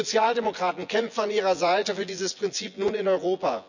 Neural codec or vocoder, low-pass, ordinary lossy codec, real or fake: vocoder, 24 kHz, 100 mel bands, Vocos; 7.2 kHz; none; fake